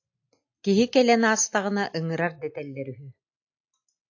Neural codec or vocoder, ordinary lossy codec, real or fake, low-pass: none; AAC, 48 kbps; real; 7.2 kHz